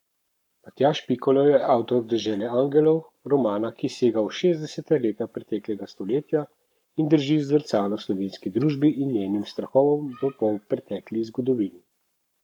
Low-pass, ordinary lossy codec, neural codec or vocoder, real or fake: 19.8 kHz; none; codec, 44.1 kHz, 7.8 kbps, Pupu-Codec; fake